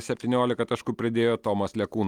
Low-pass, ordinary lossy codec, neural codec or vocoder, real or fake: 19.8 kHz; Opus, 32 kbps; none; real